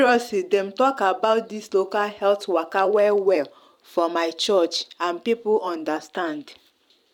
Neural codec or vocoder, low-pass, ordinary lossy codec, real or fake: vocoder, 44.1 kHz, 128 mel bands every 512 samples, BigVGAN v2; 19.8 kHz; none; fake